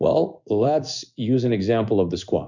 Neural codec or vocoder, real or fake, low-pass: codec, 16 kHz in and 24 kHz out, 1 kbps, XY-Tokenizer; fake; 7.2 kHz